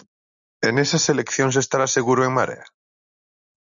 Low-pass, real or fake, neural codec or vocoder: 7.2 kHz; real; none